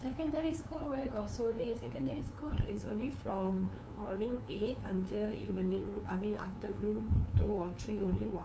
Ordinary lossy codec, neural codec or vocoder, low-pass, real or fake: none; codec, 16 kHz, 2 kbps, FunCodec, trained on LibriTTS, 25 frames a second; none; fake